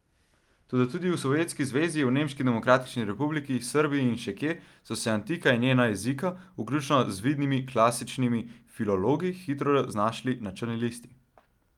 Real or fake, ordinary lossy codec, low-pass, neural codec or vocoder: real; Opus, 32 kbps; 19.8 kHz; none